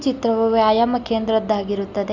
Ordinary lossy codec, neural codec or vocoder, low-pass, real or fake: none; none; 7.2 kHz; real